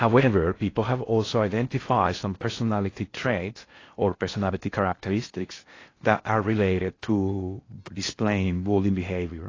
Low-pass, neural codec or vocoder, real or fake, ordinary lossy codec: 7.2 kHz; codec, 16 kHz in and 24 kHz out, 0.6 kbps, FocalCodec, streaming, 4096 codes; fake; AAC, 32 kbps